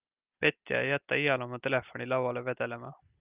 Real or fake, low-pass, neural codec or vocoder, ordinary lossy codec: real; 3.6 kHz; none; Opus, 24 kbps